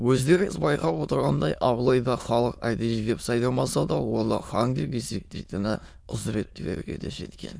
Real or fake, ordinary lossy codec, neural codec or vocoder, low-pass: fake; none; autoencoder, 22.05 kHz, a latent of 192 numbers a frame, VITS, trained on many speakers; none